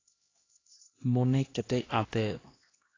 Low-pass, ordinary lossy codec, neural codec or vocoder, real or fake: 7.2 kHz; AAC, 32 kbps; codec, 16 kHz, 1 kbps, X-Codec, HuBERT features, trained on LibriSpeech; fake